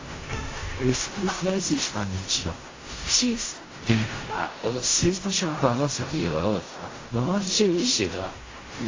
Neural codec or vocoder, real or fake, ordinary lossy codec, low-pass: codec, 16 kHz in and 24 kHz out, 0.4 kbps, LongCat-Audio-Codec, fine tuned four codebook decoder; fake; AAC, 32 kbps; 7.2 kHz